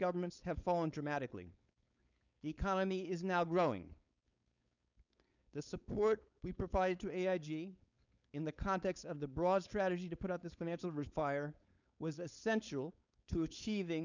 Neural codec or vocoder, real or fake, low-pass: codec, 16 kHz, 4.8 kbps, FACodec; fake; 7.2 kHz